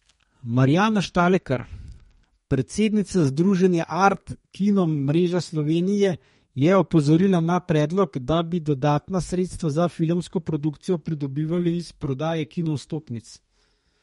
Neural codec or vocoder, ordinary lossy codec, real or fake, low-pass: codec, 32 kHz, 1.9 kbps, SNAC; MP3, 48 kbps; fake; 14.4 kHz